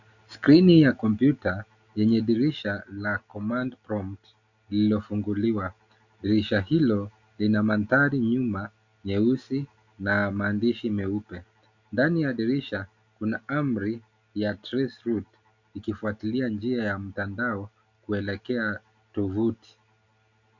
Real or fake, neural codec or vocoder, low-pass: real; none; 7.2 kHz